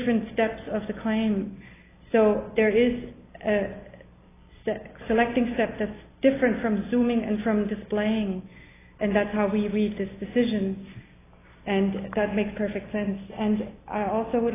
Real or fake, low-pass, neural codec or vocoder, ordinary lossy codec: real; 3.6 kHz; none; AAC, 16 kbps